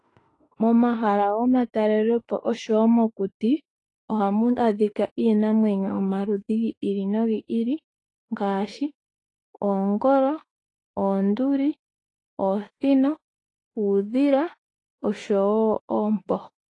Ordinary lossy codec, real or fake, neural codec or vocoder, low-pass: AAC, 32 kbps; fake; autoencoder, 48 kHz, 32 numbers a frame, DAC-VAE, trained on Japanese speech; 10.8 kHz